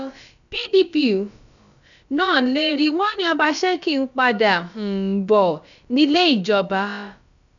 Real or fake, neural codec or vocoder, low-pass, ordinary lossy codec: fake; codec, 16 kHz, about 1 kbps, DyCAST, with the encoder's durations; 7.2 kHz; none